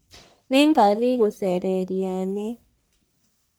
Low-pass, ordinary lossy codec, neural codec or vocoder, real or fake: none; none; codec, 44.1 kHz, 1.7 kbps, Pupu-Codec; fake